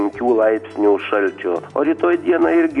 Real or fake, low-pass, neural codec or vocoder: real; 10.8 kHz; none